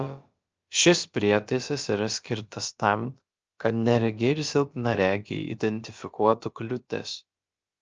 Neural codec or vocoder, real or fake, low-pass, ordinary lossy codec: codec, 16 kHz, about 1 kbps, DyCAST, with the encoder's durations; fake; 7.2 kHz; Opus, 24 kbps